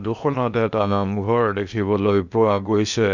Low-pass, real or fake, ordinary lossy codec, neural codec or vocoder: 7.2 kHz; fake; none; codec, 16 kHz in and 24 kHz out, 0.8 kbps, FocalCodec, streaming, 65536 codes